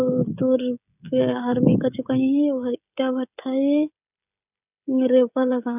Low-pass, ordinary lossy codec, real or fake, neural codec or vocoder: 3.6 kHz; none; fake; codec, 16 kHz, 16 kbps, FreqCodec, smaller model